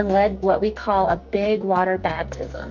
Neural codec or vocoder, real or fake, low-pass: codec, 44.1 kHz, 2.6 kbps, SNAC; fake; 7.2 kHz